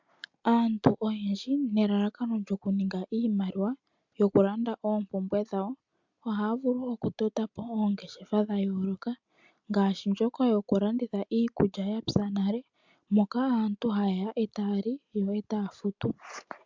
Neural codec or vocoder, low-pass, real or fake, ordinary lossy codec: none; 7.2 kHz; real; MP3, 64 kbps